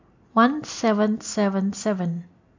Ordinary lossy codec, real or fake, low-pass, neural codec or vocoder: AAC, 48 kbps; real; 7.2 kHz; none